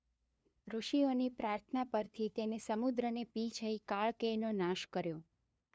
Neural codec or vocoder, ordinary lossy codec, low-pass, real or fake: codec, 16 kHz, 2 kbps, FunCodec, trained on LibriTTS, 25 frames a second; none; none; fake